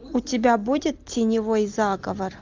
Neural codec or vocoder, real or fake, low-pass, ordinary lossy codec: none; real; 7.2 kHz; Opus, 24 kbps